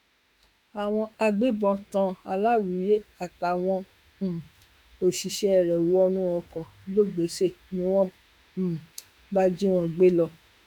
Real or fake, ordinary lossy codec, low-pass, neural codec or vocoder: fake; none; 19.8 kHz; autoencoder, 48 kHz, 32 numbers a frame, DAC-VAE, trained on Japanese speech